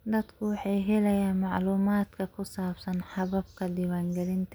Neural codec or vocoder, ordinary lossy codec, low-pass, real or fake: none; none; none; real